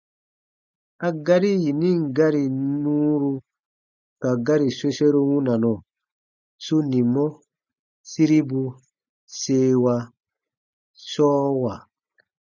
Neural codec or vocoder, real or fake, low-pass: none; real; 7.2 kHz